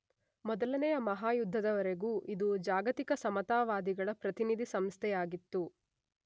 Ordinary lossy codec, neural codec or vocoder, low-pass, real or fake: none; none; none; real